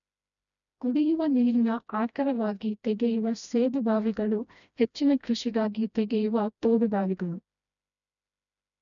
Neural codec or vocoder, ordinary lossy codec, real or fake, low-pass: codec, 16 kHz, 1 kbps, FreqCodec, smaller model; none; fake; 7.2 kHz